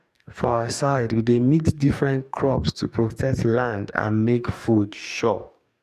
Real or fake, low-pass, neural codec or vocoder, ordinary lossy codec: fake; 14.4 kHz; codec, 44.1 kHz, 2.6 kbps, DAC; none